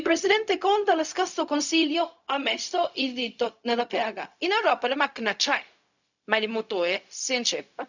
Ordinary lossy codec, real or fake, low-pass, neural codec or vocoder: none; fake; 7.2 kHz; codec, 16 kHz, 0.4 kbps, LongCat-Audio-Codec